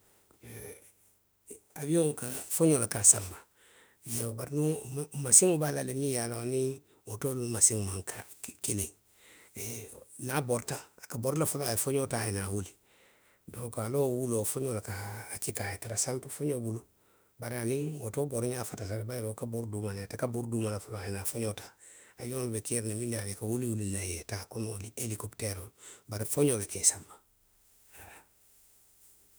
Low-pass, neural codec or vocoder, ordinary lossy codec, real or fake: none; autoencoder, 48 kHz, 32 numbers a frame, DAC-VAE, trained on Japanese speech; none; fake